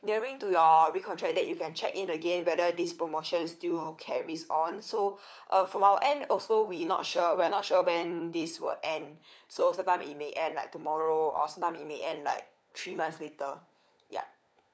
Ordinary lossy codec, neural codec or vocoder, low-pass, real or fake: none; codec, 16 kHz, 4 kbps, FunCodec, trained on LibriTTS, 50 frames a second; none; fake